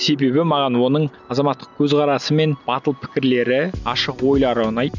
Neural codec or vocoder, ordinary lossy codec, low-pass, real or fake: none; none; 7.2 kHz; real